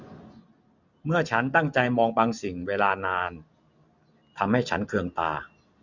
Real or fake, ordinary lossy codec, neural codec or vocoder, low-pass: real; none; none; 7.2 kHz